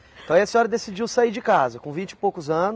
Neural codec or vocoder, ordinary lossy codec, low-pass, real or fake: none; none; none; real